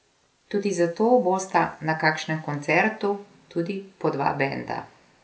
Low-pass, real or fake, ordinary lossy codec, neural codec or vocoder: none; real; none; none